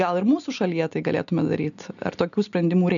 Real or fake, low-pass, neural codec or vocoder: real; 7.2 kHz; none